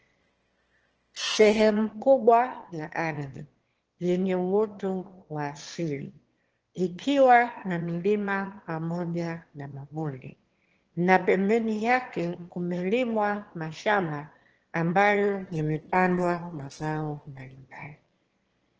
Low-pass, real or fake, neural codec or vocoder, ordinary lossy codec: 7.2 kHz; fake; autoencoder, 22.05 kHz, a latent of 192 numbers a frame, VITS, trained on one speaker; Opus, 16 kbps